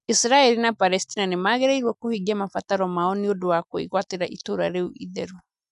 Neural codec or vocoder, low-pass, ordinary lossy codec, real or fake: none; 10.8 kHz; none; real